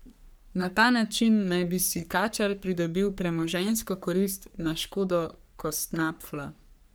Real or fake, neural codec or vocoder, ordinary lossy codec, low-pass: fake; codec, 44.1 kHz, 3.4 kbps, Pupu-Codec; none; none